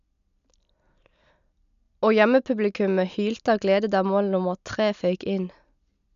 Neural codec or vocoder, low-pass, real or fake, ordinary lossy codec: none; 7.2 kHz; real; none